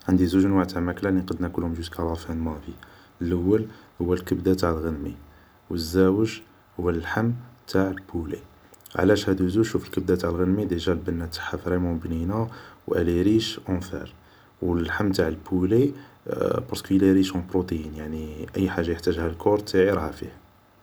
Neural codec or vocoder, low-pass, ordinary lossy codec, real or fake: none; none; none; real